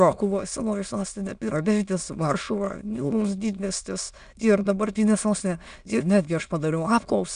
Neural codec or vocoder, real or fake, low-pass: autoencoder, 22.05 kHz, a latent of 192 numbers a frame, VITS, trained on many speakers; fake; 9.9 kHz